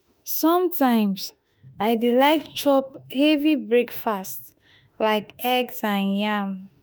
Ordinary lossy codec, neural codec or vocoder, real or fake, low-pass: none; autoencoder, 48 kHz, 32 numbers a frame, DAC-VAE, trained on Japanese speech; fake; none